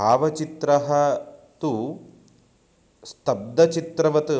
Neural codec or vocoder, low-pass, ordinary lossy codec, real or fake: none; none; none; real